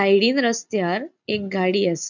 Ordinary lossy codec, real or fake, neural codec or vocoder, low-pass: none; real; none; 7.2 kHz